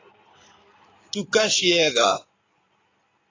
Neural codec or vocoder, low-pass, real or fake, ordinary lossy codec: codec, 16 kHz in and 24 kHz out, 2.2 kbps, FireRedTTS-2 codec; 7.2 kHz; fake; AAC, 32 kbps